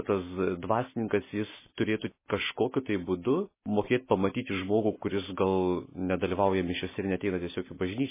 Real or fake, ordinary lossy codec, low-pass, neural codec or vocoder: real; MP3, 16 kbps; 3.6 kHz; none